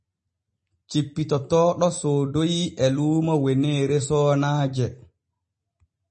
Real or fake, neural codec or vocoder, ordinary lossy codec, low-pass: real; none; MP3, 32 kbps; 10.8 kHz